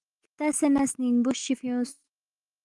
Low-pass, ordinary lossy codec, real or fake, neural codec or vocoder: 10.8 kHz; Opus, 24 kbps; fake; autoencoder, 48 kHz, 128 numbers a frame, DAC-VAE, trained on Japanese speech